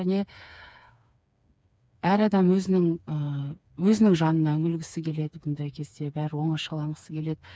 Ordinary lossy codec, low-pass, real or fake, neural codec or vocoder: none; none; fake; codec, 16 kHz, 4 kbps, FreqCodec, smaller model